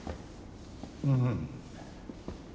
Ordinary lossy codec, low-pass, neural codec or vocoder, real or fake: none; none; none; real